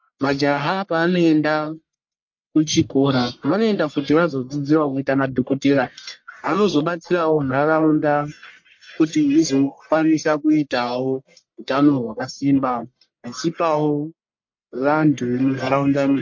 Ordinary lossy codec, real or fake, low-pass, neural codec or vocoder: MP3, 48 kbps; fake; 7.2 kHz; codec, 44.1 kHz, 1.7 kbps, Pupu-Codec